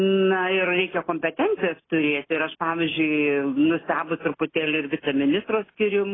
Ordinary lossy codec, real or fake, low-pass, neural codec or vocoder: AAC, 16 kbps; real; 7.2 kHz; none